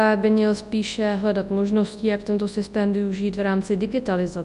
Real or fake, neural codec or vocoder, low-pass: fake; codec, 24 kHz, 0.9 kbps, WavTokenizer, large speech release; 10.8 kHz